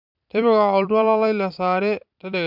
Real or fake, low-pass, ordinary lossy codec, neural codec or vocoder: fake; 5.4 kHz; none; vocoder, 44.1 kHz, 128 mel bands every 256 samples, BigVGAN v2